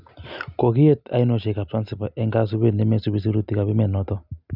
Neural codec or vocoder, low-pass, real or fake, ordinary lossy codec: none; 5.4 kHz; real; none